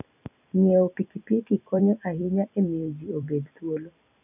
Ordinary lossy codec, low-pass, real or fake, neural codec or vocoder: none; 3.6 kHz; fake; vocoder, 44.1 kHz, 128 mel bands every 256 samples, BigVGAN v2